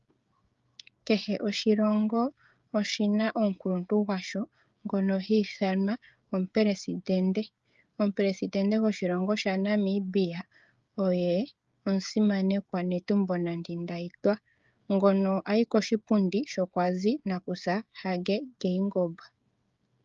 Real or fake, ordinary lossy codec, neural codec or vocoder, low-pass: fake; Opus, 32 kbps; codec, 16 kHz, 16 kbps, FreqCodec, smaller model; 7.2 kHz